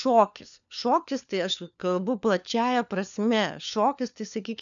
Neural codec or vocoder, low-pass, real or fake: codec, 16 kHz, 4 kbps, FunCodec, trained on LibriTTS, 50 frames a second; 7.2 kHz; fake